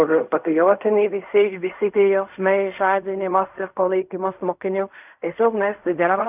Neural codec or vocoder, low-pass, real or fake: codec, 16 kHz in and 24 kHz out, 0.4 kbps, LongCat-Audio-Codec, fine tuned four codebook decoder; 3.6 kHz; fake